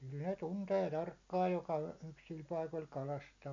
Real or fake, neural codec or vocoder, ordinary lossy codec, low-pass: real; none; MP3, 64 kbps; 7.2 kHz